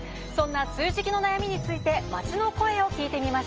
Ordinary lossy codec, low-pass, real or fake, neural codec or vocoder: Opus, 24 kbps; 7.2 kHz; real; none